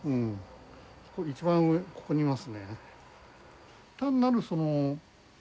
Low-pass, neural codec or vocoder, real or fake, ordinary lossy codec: none; none; real; none